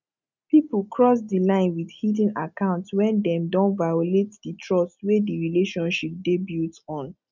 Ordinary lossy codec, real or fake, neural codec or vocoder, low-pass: none; real; none; 7.2 kHz